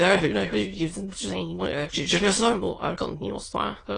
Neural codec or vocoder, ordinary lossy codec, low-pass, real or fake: autoencoder, 22.05 kHz, a latent of 192 numbers a frame, VITS, trained on many speakers; AAC, 32 kbps; 9.9 kHz; fake